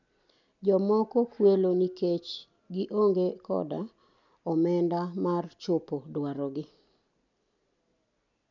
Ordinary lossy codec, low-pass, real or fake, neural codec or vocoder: none; 7.2 kHz; real; none